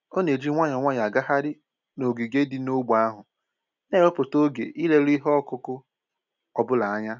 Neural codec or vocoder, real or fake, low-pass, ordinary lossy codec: none; real; 7.2 kHz; none